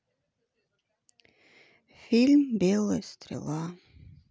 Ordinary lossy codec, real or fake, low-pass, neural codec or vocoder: none; real; none; none